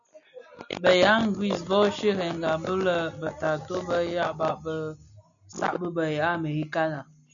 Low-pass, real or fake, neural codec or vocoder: 7.2 kHz; real; none